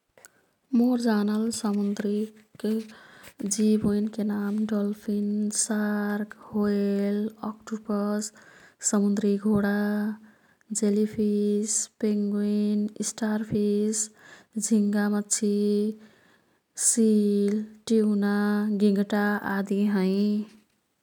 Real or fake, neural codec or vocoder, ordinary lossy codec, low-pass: real; none; none; 19.8 kHz